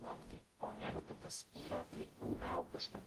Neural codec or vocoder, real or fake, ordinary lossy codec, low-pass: codec, 44.1 kHz, 0.9 kbps, DAC; fake; Opus, 24 kbps; 14.4 kHz